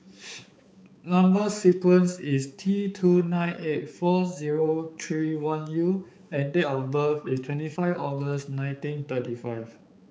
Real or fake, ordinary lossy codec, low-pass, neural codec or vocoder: fake; none; none; codec, 16 kHz, 4 kbps, X-Codec, HuBERT features, trained on balanced general audio